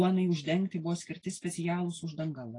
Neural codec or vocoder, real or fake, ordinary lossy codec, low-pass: none; real; AAC, 32 kbps; 10.8 kHz